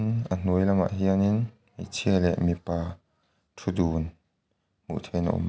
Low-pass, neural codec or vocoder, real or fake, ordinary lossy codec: none; none; real; none